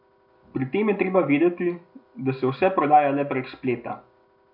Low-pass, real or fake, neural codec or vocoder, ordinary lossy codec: 5.4 kHz; real; none; none